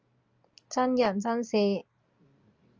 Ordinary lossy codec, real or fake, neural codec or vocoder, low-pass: Opus, 24 kbps; real; none; 7.2 kHz